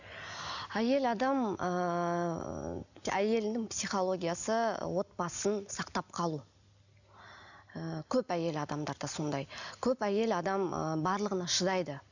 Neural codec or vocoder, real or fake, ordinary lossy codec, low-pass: none; real; none; 7.2 kHz